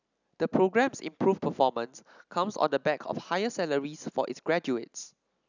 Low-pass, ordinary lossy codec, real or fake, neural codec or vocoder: 7.2 kHz; none; real; none